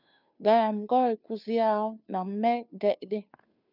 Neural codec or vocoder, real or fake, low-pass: codec, 16 kHz, 2 kbps, FunCodec, trained on Chinese and English, 25 frames a second; fake; 5.4 kHz